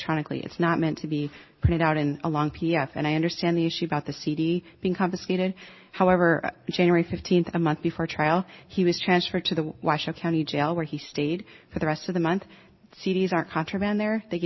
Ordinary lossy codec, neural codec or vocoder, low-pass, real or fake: MP3, 24 kbps; none; 7.2 kHz; real